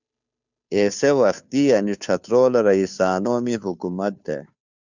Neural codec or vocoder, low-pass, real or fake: codec, 16 kHz, 2 kbps, FunCodec, trained on Chinese and English, 25 frames a second; 7.2 kHz; fake